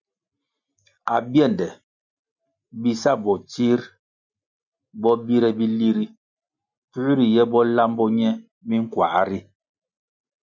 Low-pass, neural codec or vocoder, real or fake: 7.2 kHz; none; real